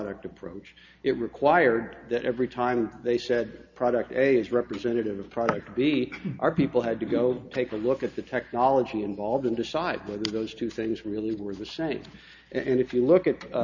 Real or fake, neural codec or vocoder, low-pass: real; none; 7.2 kHz